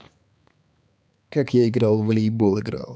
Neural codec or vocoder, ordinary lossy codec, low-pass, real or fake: codec, 16 kHz, 4 kbps, X-Codec, HuBERT features, trained on balanced general audio; none; none; fake